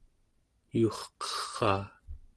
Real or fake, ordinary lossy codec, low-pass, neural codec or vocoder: real; Opus, 16 kbps; 10.8 kHz; none